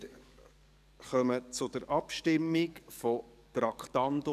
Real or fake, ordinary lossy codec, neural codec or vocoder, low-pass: fake; none; codec, 44.1 kHz, 7.8 kbps, DAC; 14.4 kHz